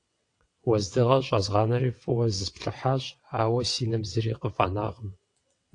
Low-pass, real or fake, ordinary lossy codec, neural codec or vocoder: 9.9 kHz; fake; AAC, 48 kbps; vocoder, 22.05 kHz, 80 mel bands, WaveNeXt